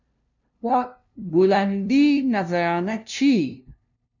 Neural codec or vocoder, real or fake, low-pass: codec, 16 kHz, 0.5 kbps, FunCodec, trained on LibriTTS, 25 frames a second; fake; 7.2 kHz